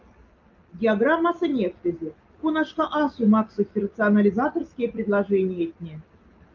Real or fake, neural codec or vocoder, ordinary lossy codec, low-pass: real; none; Opus, 24 kbps; 7.2 kHz